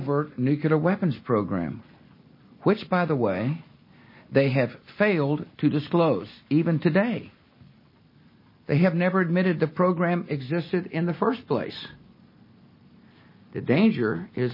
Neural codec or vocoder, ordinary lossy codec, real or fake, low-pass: none; MP3, 24 kbps; real; 5.4 kHz